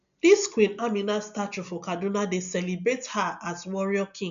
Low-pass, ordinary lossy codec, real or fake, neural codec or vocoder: 7.2 kHz; none; real; none